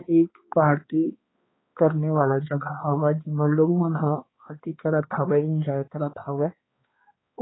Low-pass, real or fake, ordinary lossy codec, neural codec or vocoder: 7.2 kHz; fake; AAC, 16 kbps; codec, 16 kHz, 2 kbps, X-Codec, HuBERT features, trained on balanced general audio